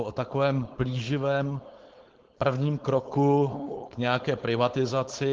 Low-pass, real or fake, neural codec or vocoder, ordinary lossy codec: 7.2 kHz; fake; codec, 16 kHz, 4.8 kbps, FACodec; Opus, 16 kbps